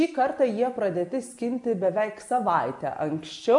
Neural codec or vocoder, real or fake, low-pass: none; real; 10.8 kHz